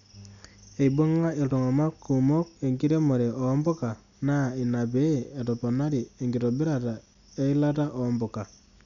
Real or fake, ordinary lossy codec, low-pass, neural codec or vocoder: real; none; 7.2 kHz; none